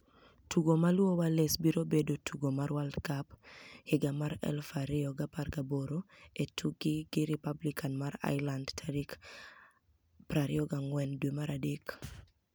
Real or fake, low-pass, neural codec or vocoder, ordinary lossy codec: real; none; none; none